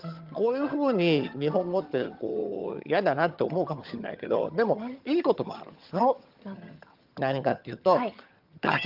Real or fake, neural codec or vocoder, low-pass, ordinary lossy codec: fake; vocoder, 22.05 kHz, 80 mel bands, HiFi-GAN; 5.4 kHz; Opus, 24 kbps